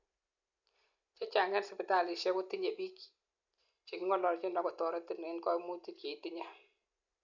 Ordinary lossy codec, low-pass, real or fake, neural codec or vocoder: none; 7.2 kHz; real; none